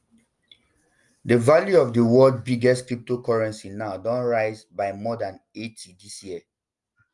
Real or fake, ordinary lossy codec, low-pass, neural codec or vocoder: real; Opus, 32 kbps; 10.8 kHz; none